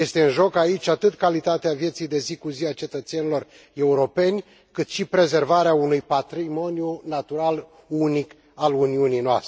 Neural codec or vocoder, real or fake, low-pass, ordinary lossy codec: none; real; none; none